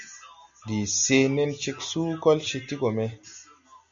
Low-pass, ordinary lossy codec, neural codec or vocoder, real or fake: 7.2 kHz; MP3, 64 kbps; none; real